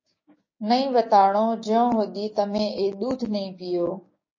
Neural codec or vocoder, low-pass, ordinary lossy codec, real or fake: codec, 16 kHz, 6 kbps, DAC; 7.2 kHz; MP3, 32 kbps; fake